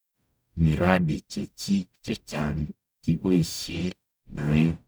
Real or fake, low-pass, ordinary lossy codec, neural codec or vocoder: fake; none; none; codec, 44.1 kHz, 0.9 kbps, DAC